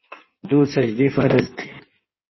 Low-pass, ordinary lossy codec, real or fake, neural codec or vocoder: 7.2 kHz; MP3, 24 kbps; fake; codec, 16 kHz in and 24 kHz out, 1.1 kbps, FireRedTTS-2 codec